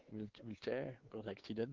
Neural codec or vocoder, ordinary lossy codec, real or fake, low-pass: codec, 16 kHz, 4 kbps, X-Codec, WavLM features, trained on Multilingual LibriSpeech; Opus, 16 kbps; fake; 7.2 kHz